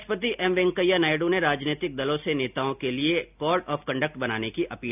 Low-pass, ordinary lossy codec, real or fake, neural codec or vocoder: 3.6 kHz; none; real; none